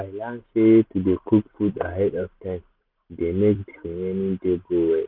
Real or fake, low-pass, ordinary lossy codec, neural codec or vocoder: real; 5.4 kHz; none; none